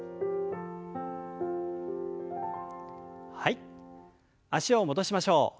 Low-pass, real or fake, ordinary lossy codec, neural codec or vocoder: none; real; none; none